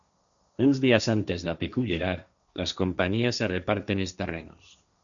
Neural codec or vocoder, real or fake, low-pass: codec, 16 kHz, 1.1 kbps, Voila-Tokenizer; fake; 7.2 kHz